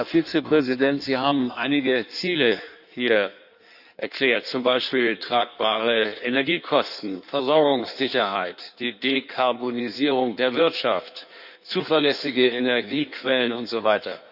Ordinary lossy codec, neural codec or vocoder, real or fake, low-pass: none; codec, 16 kHz in and 24 kHz out, 1.1 kbps, FireRedTTS-2 codec; fake; 5.4 kHz